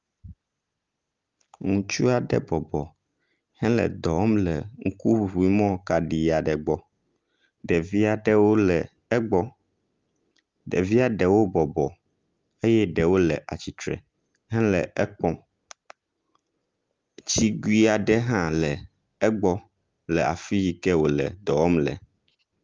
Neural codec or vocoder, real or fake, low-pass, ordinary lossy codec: none; real; 7.2 kHz; Opus, 32 kbps